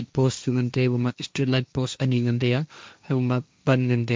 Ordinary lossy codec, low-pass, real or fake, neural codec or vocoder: none; none; fake; codec, 16 kHz, 1.1 kbps, Voila-Tokenizer